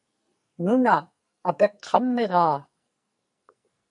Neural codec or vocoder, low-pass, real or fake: codec, 44.1 kHz, 2.6 kbps, SNAC; 10.8 kHz; fake